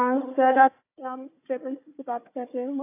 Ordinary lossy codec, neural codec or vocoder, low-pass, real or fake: AAC, 24 kbps; codec, 16 kHz, 4 kbps, FunCodec, trained on Chinese and English, 50 frames a second; 3.6 kHz; fake